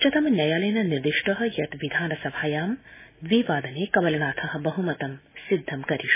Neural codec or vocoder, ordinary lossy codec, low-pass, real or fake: none; MP3, 16 kbps; 3.6 kHz; real